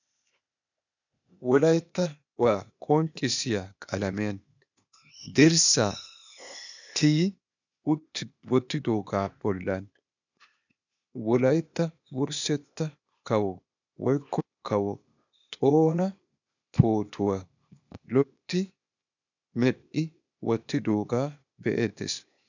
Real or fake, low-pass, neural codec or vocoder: fake; 7.2 kHz; codec, 16 kHz, 0.8 kbps, ZipCodec